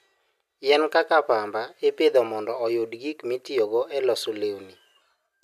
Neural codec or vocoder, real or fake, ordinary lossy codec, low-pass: none; real; none; 14.4 kHz